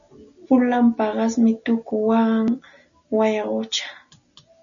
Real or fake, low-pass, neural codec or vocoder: real; 7.2 kHz; none